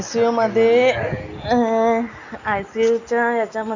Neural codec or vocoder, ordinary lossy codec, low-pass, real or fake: none; Opus, 64 kbps; 7.2 kHz; real